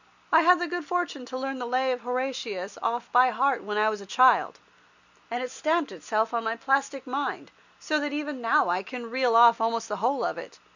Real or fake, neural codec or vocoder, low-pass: real; none; 7.2 kHz